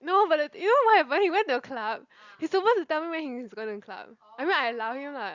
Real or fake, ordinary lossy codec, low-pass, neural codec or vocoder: real; Opus, 64 kbps; 7.2 kHz; none